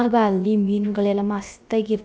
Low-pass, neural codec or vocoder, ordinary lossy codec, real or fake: none; codec, 16 kHz, about 1 kbps, DyCAST, with the encoder's durations; none; fake